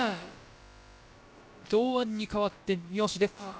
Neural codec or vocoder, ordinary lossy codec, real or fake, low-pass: codec, 16 kHz, about 1 kbps, DyCAST, with the encoder's durations; none; fake; none